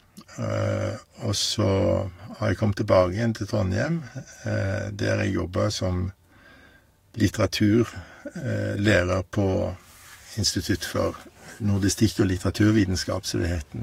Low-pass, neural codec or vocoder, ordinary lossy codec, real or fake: 19.8 kHz; codec, 44.1 kHz, 7.8 kbps, DAC; AAC, 48 kbps; fake